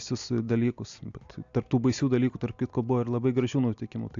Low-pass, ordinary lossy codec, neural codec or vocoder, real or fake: 7.2 kHz; AAC, 64 kbps; none; real